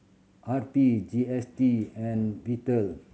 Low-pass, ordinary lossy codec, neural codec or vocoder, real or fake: none; none; none; real